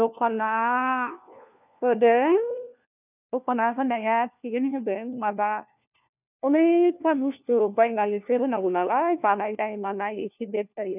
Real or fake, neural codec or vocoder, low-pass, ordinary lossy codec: fake; codec, 16 kHz, 1 kbps, FunCodec, trained on LibriTTS, 50 frames a second; 3.6 kHz; none